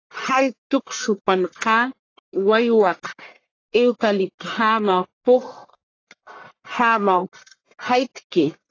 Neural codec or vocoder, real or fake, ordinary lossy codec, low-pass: codec, 44.1 kHz, 1.7 kbps, Pupu-Codec; fake; AAC, 32 kbps; 7.2 kHz